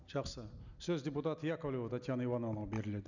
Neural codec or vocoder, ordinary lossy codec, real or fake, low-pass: none; none; real; 7.2 kHz